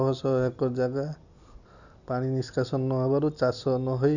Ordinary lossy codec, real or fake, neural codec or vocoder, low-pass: none; real; none; 7.2 kHz